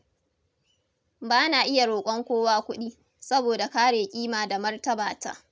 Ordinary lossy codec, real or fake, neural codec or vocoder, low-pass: none; real; none; none